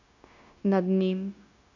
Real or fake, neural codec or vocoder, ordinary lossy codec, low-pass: fake; codec, 16 kHz, 0.9 kbps, LongCat-Audio-Codec; none; 7.2 kHz